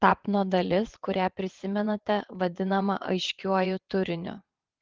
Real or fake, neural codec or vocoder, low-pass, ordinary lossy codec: fake; vocoder, 22.05 kHz, 80 mel bands, WaveNeXt; 7.2 kHz; Opus, 16 kbps